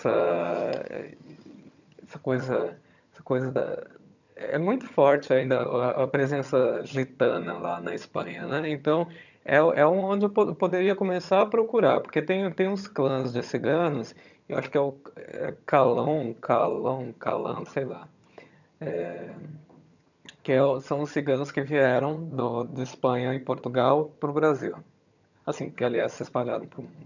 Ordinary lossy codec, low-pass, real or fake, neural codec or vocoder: none; 7.2 kHz; fake; vocoder, 22.05 kHz, 80 mel bands, HiFi-GAN